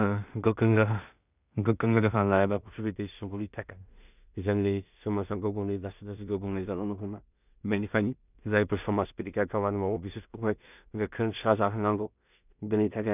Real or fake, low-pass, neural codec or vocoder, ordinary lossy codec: fake; 3.6 kHz; codec, 16 kHz in and 24 kHz out, 0.4 kbps, LongCat-Audio-Codec, two codebook decoder; none